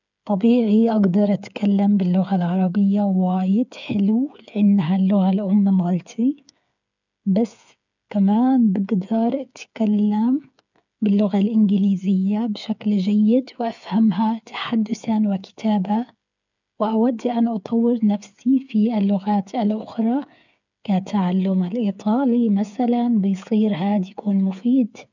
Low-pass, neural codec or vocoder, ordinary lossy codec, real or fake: 7.2 kHz; codec, 16 kHz, 8 kbps, FreqCodec, smaller model; none; fake